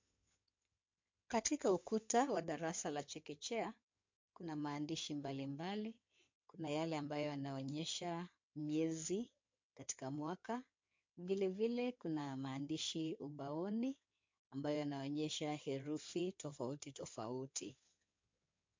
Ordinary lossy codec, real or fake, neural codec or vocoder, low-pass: MP3, 48 kbps; fake; codec, 16 kHz in and 24 kHz out, 2.2 kbps, FireRedTTS-2 codec; 7.2 kHz